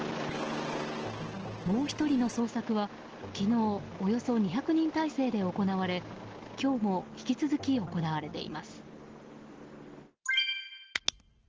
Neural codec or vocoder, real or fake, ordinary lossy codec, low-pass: none; real; Opus, 16 kbps; 7.2 kHz